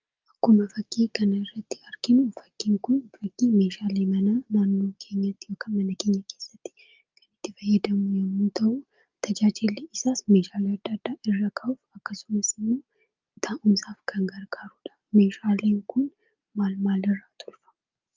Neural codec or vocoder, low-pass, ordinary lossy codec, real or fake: none; 7.2 kHz; Opus, 32 kbps; real